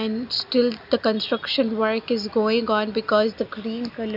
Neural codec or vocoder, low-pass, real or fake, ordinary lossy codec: none; 5.4 kHz; real; none